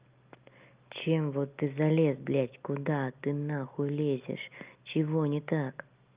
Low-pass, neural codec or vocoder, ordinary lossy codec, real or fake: 3.6 kHz; none; Opus, 24 kbps; real